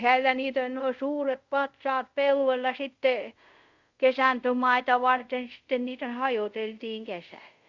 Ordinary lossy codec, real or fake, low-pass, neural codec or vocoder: Opus, 64 kbps; fake; 7.2 kHz; codec, 24 kHz, 0.5 kbps, DualCodec